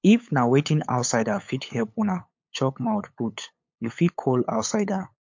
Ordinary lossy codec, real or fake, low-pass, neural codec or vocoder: MP3, 48 kbps; fake; 7.2 kHz; codec, 16 kHz, 8 kbps, FunCodec, trained on LibriTTS, 25 frames a second